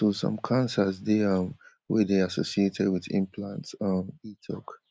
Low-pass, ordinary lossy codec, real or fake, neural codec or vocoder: none; none; real; none